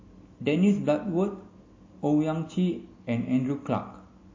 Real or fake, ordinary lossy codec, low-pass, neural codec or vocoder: real; MP3, 32 kbps; 7.2 kHz; none